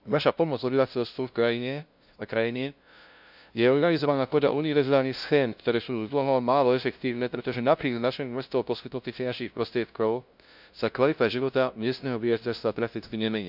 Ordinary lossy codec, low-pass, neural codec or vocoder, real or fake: none; 5.4 kHz; codec, 16 kHz, 0.5 kbps, FunCodec, trained on LibriTTS, 25 frames a second; fake